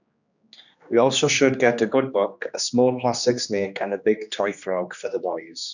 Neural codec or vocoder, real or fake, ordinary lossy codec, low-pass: codec, 16 kHz, 2 kbps, X-Codec, HuBERT features, trained on general audio; fake; none; 7.2 kHz